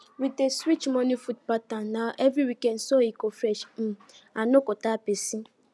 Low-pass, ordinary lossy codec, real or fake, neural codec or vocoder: none; none; real; none